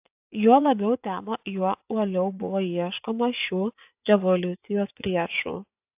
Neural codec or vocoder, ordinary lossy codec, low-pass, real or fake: vocoder, 24 kHz, 100 mel bands, Vocos; AAC, 32 kbps; 3.6 kHz; fake